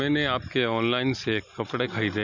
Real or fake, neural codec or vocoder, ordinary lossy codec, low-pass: real; none; none; 7.2 kHz